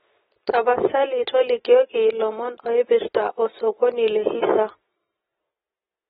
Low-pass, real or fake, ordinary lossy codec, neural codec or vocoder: 7.2 kHz; real; AAC, 16 kbps; none